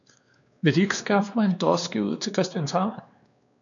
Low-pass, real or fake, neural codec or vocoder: 7.2 kHz; fake; codec, 16 kHz, 2 kbps, X-Codec, WavLM features, trained on Multilingual LibriSpeech